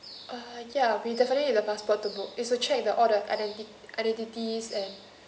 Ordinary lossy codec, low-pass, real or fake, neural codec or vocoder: none; none; real; none